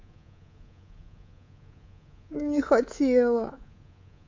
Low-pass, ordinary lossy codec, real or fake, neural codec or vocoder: 7.2 kHz; MP3, 48 kbps; fake; codec, 24 kHz, 3.1 kbps, DualCodec